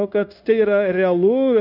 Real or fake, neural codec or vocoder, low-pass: fake; codec, 16 kHz, 0.9 kbps, LongCat-Audio-Codec; 5.4 kHz